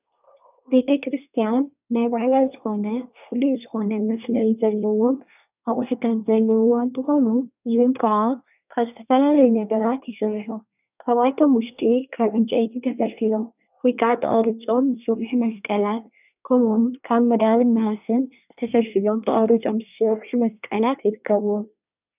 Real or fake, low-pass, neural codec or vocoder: fake; 3.6 kHz; codec, 24 kHz, 1 kbps, SNAC